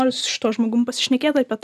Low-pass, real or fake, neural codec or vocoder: 14.4 kHz; real; none